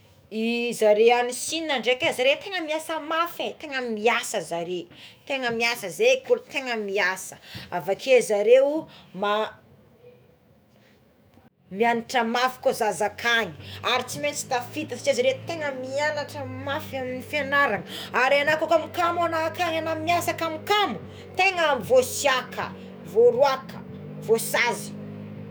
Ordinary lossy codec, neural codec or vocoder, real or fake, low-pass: none; autoencoder, 48 kHz, 128 numbers a frame, DAC-VAE, trained on Japanese speech; fake; none